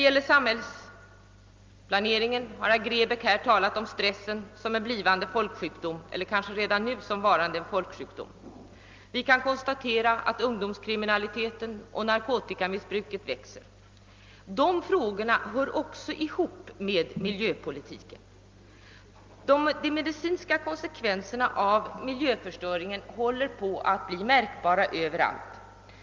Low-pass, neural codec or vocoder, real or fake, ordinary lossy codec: 7.2 kHz; none; real; Opus, 32 kbps